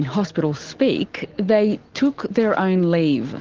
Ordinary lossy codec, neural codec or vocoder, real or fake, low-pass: Opus, 16 kbps; none; real; 7.2 kHz